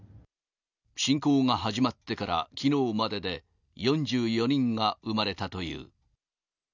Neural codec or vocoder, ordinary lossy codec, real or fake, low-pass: none; none; real; 7.2 kHz